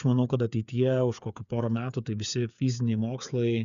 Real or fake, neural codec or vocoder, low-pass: fake; codec, 16 kHz, 8 kbps, FreqCodec, smaller model; 7.2 kHz